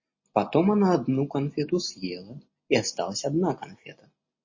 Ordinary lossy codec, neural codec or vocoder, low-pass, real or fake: MP3, 32 kbps; none; 7.2 kHz; real